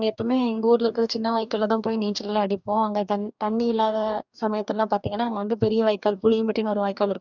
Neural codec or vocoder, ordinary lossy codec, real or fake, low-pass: codec, 44.1 kHz, 2.6 kbps, DAC; none; fake; 7.2 kHz